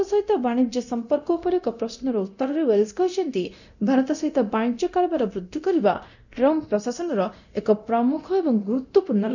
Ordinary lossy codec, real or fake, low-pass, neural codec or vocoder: none; fake; 7.2 kHz; codec, 24 kHz, 0.9 kbps, DualCodec